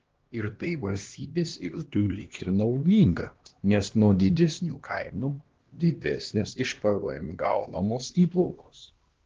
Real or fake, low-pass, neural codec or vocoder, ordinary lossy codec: fake; 7.2 kHz; codec, 16 kHz, 1 kbps, X-Codec, HuBERT features, trained on LibriSpeech; Opus, 16 kbps